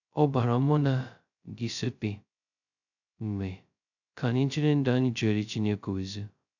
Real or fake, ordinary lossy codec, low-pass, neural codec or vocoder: fake; none; 7.2 kHz; codec, 16 kHz, 0.2 kbps, FocalCodec